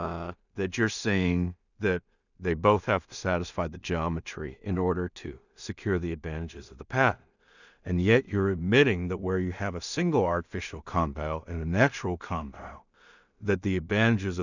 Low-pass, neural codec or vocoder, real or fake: 7.2 kHz; codec, 16 kHz in and 24 kHz out, 0.4 kbps, LongCat-Audio-Codec, two codebook decoder; fake